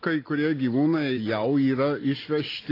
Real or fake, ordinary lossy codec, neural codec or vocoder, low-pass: real; AAC, 24 kbps; none; 5.4 kHz